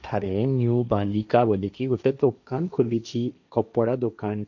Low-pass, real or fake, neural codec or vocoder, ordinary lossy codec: 7.2 kHz; fake; codec, 16 kHz, 1.1 kbps, Voila-Tokenizer; none